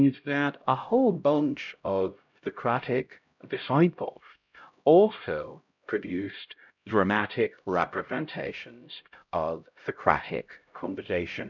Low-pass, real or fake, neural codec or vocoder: 7.2 kHz; fake; codec, 16 kHz, 0.5 kbps, X-Codec, HuBERT features, trained on LibriSpeech